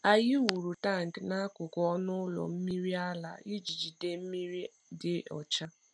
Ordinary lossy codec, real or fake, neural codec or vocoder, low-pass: none; real; none; 9.9 kHz